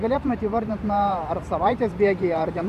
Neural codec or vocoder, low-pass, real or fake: vocoder, 44.1 kHz, 128 mel bands every 512 samples, BigVGAN v2; 14.4 kHz; fake